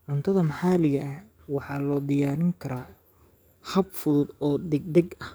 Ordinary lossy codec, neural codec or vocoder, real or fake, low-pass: none; codec, 44.1 kHz, 7.8 kbps, DAC; fake; none